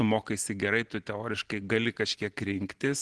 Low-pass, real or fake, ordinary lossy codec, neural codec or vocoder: 10.8 kHz; real; Opus, 16 kbps; none